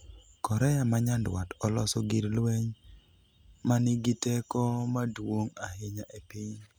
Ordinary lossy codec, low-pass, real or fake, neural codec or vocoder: none; none; real; none